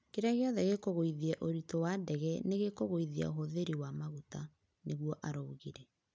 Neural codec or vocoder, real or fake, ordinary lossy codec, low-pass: none; real; none; none